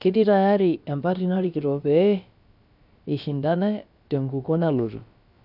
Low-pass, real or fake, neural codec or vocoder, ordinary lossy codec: 5.4 kHz; fake; codec, 16 kHz, about 1 kbps, DyCAST, with the encoder's durations; none